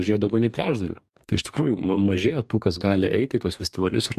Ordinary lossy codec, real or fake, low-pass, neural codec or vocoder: MP3, 96 kbps; fake; 14.4 kHz; codec, 44.1 kHz, 2.6 kbps, DAC